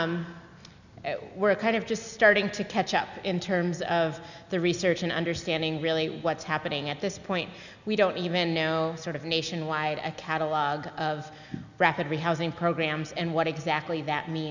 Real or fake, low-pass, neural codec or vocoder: real; 7.2 kHz; none